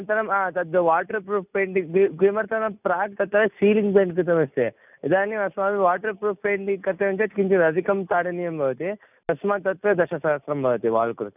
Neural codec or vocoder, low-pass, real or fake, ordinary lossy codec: none; 3.6 kHz; real; none